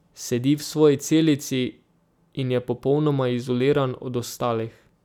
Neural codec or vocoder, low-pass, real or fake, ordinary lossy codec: none; 19.8 kHz; real; none